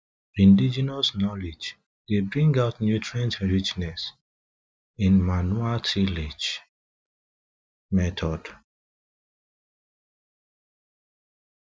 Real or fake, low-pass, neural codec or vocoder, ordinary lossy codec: real; none; none; none